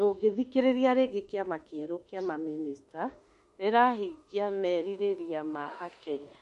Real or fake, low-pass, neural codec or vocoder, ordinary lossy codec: fake; 14.4 kHz; autoencoder, 48 kHz, 32 numbers a frame, DAC-VAE, trained on Japanese speech; MP3, 48 kbps